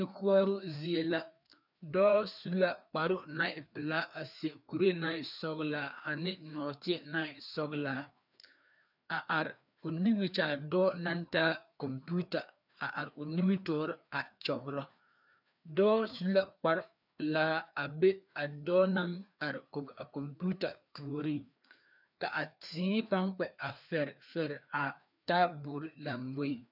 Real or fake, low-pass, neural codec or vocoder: fake; 5.4 kHz; codec, 16 kHz, 2 kbps, FreqCodec, larger model